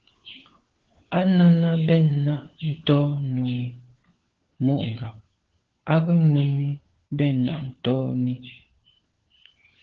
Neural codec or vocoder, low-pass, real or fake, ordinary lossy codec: codec, 16 kHz, 4 kbps, FunCodec, trained on LibriTTS, 50 frames a second; 7.2 kHz; fake; Opus, 16 kbps